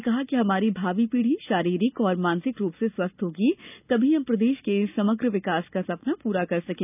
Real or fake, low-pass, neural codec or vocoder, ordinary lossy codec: real; 3.6 kHz; none; none